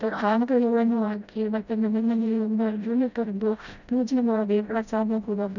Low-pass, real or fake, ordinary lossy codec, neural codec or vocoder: 7.2 kHz; fake; none; codec, 16 kHz, 0.5 kbps, FreqCodec, smaller model